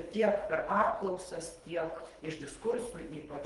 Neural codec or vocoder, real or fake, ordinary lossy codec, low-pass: codec, 24 kHz, 3 kbps, HILCodec; fake; Opus, 32 kbps; 10.8 kHz